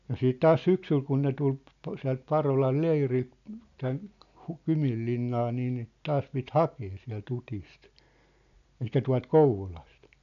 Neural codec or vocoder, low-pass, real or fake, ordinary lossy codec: none; 7.2 kHz; real; none